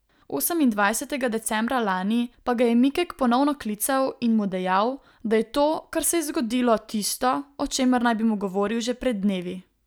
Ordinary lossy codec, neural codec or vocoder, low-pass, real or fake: none; none; none; real